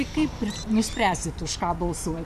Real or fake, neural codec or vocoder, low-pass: fake; vocoder, 44.1 kHz, 128 mel bands every 512 samples, BigVGAN v2; 14.4 kHz